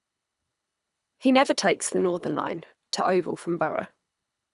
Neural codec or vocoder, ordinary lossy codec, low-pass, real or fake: codec, 24 kHz, 3 kbps, HILCodec; none; 10.8 kHz; fake